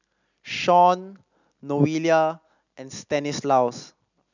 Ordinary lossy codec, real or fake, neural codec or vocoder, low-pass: none; real; none; 7.2 kHz